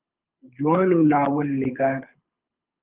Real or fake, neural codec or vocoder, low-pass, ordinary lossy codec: fake; codec, 24 kHz, 6 kbps, HILCodec; 3.6 kHz; Opus, 24 kbps